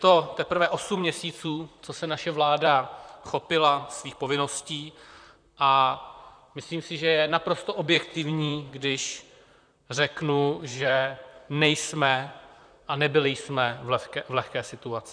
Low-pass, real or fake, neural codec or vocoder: 9.9 kHz; fake; vocoder, 44.1 kHz, 128 mel bands, Pupu-Vocoder